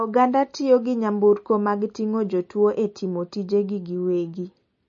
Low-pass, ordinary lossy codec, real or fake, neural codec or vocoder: 7.2 kHz; MP3, 32 kbps; real; none